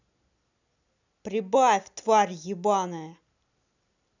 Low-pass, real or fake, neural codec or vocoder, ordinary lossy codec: 7.2 kHz; real; none; none